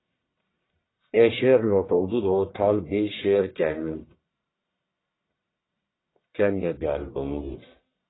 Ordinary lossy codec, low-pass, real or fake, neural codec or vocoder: AAC, 16 kbps; 7.2 kHz; fake; codec, 44.1 kHz, 1.7 kbps, Pupu-Codec